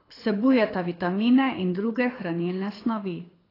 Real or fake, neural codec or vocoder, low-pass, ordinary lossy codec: fake; codec, 24 kHz, 6 kbps, HILCodec; 5.4 kHz; AAC, 24 kbps